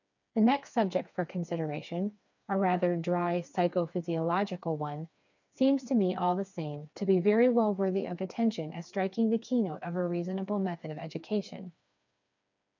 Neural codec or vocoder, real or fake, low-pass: codec, 16 kHz, 4 kbps, FreqCodec, smaller model; fake; 7.2 kHz